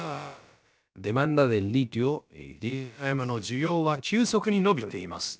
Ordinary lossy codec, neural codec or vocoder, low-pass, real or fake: none; codec, 16 kHz, about 1 kbps, DyCAST, with the encoder's durations; none; fake